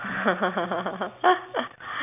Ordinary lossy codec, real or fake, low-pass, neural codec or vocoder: none; real; 3.6 kHz; none